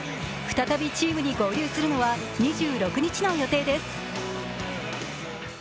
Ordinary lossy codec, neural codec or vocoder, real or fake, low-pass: none; none; real; none